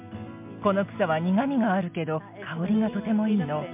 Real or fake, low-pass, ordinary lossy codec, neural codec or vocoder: real; 3.6 kHz; MP3, 32 kbps; none